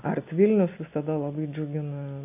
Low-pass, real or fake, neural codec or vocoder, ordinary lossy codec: 3.6 kHz; real; none; AAC, 32 kbps